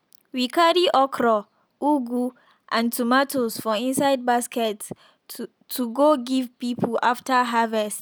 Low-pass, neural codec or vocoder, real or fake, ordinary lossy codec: none; none; real; none